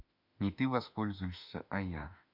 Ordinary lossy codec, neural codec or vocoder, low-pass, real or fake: none; autoencoder, 48 kHz, 32 numbers a frame, DAC-VAE, trained on Japanese speech; 5.4 kHz; fake